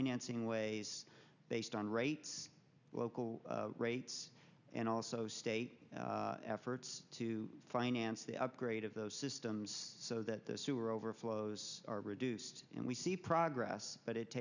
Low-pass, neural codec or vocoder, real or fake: 7.2 kHz; none; real